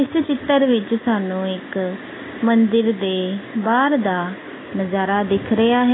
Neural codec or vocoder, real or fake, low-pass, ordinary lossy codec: none; real; 7.2 kHz; AAC, 16 kbps